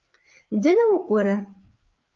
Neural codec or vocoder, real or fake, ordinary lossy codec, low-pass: codec, 16 kHz, 4 kbps, FreqCodec, larger model; fake; Opus, 32 kbps; 7.2 kHz